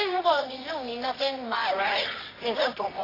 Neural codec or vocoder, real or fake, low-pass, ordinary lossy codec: codec, 24 kHz, 0.9 kbps, WavTokenizer, medium music audio release; fake; 5.4 kHz; AAC, 24 kbps